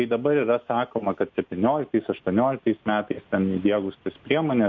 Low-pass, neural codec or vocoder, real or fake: 7.2 kHz; none; real